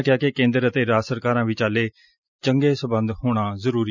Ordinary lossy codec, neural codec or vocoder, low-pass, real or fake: none; none; 7.2 kHz; real